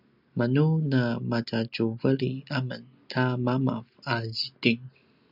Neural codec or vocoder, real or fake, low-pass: none; real; 5.4 kHz